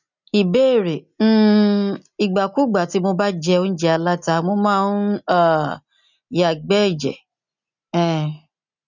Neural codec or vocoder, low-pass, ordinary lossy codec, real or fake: none; 7.2 kHz; none; real